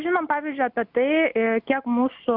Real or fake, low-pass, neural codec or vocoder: real; 5.4 kHz; none